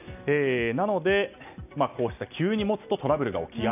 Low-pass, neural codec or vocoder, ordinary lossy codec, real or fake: 3.6 kHz; none; none; real